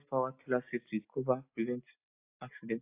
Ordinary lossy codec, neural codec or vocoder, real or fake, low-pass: AAC, 24 kbps; none; real; 3.6 kHz